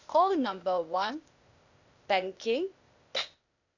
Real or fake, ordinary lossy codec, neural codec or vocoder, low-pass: fake; none; codec, 16 kHz, 0.8 kbps, ZipCodec; 7.2 kHz